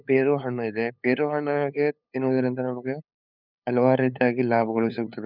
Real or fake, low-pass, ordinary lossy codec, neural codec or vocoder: fake; 5.4 kHz; none; codec, 16 kHz, 8 kbps, FunCodec, trained on LibriTTS, 25 frames a second